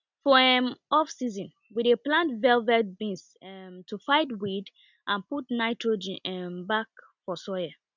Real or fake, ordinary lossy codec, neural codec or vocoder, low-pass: real; none; none; 7.2 kHz